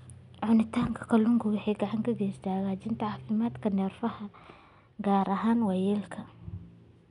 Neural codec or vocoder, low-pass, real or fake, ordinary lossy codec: none; 10.8 kHz; real; none